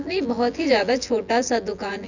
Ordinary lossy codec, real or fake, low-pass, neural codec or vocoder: none; fake; 7.2 kHz; vocoder, 24 kHz, 100 mel bands, Vocos